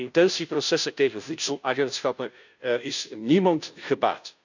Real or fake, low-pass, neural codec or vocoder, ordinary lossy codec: fake; 7.2 kHz; codec, 16 kHz, 0.5 kbps, FunCodec, trained on Chinese and English, 25 frames a second; none